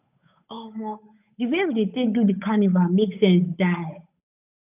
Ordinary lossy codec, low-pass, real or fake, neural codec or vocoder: none; 3.6 kHz; fake; codec, 16 kHz, 8 kbps, FunCodec, trained on Chinese and English, 25 frames a second